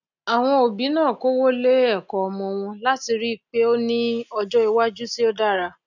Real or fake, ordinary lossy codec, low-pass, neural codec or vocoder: real; none; 7.2 kHz; none